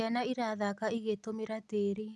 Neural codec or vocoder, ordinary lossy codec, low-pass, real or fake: none; none; none; real